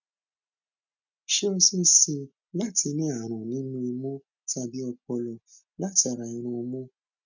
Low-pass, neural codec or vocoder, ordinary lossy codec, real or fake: 7.2 kHz; none; none; real